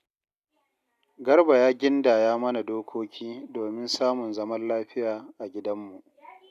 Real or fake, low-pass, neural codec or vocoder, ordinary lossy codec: real; 14.4 kHz; none; none